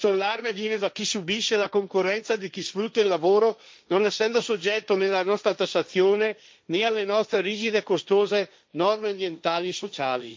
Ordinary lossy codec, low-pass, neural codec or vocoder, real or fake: none; none; codec, 16 kHz, 1.1 kbps, Voila-Tokenizer; fake